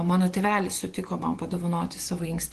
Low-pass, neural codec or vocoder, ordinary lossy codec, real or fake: 10.8 kHz; vocoder, 24 kHz, 100 mel bands, Vocos; Opus, 16 kbps; fake